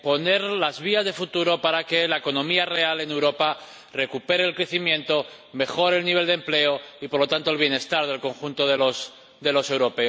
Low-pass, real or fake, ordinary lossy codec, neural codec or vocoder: none; real; none; none